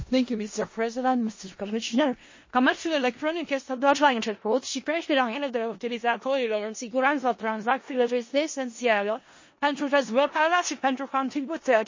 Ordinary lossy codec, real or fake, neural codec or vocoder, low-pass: MP3, 32 kbps; fake; codec, 16 kHz in and 24 kHz out, 0.4 kbps, LongCat-Audio-Codec, four codebook decoder; 7.2 kHz